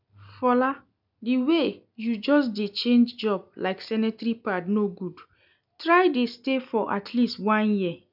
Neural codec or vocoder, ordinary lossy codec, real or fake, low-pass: none; none; real; 5.4 kHz